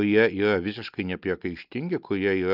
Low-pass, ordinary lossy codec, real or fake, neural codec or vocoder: 5.4 kHz; Opus, 24 kbps; real; none